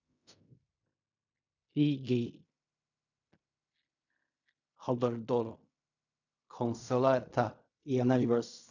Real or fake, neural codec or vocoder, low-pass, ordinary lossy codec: fake; codec, 16 kHz in and 24 kHz out, 0.4 kbps, LongCat-Audio-Codec, fine tuned four codebook decoder; 7.2 kHz; none